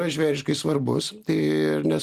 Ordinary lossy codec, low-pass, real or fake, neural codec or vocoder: Opus, 24 kbps; 14.4 kHz; real; none